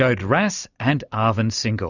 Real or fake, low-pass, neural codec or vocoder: real; 7.2 kHz; none